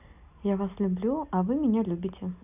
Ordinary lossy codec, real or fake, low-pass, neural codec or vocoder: none; real; 3.6 kHz; none